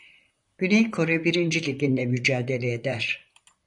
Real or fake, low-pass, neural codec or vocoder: fake; 10.8 kHz; vocoder, 44.1 kHz, 128 mel bands, Pupu-Vocoder